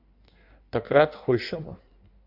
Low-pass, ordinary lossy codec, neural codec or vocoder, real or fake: 5.4 kHz; MP3, 48 kbps; codec, 16 kHz in and 24 kHz out, 1.1 kbps, FireRedTTS-2 codec; fake